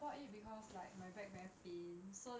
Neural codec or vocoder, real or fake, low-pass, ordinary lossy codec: none; real; none; none